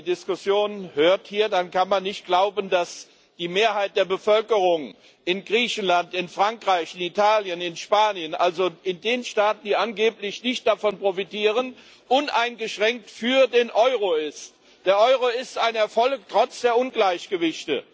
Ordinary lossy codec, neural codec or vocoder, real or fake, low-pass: none; none; real; none